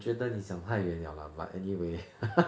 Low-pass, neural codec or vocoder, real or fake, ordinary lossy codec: none; none; real; none